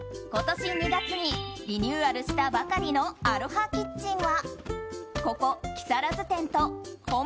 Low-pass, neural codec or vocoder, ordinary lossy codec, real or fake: none; none; none; real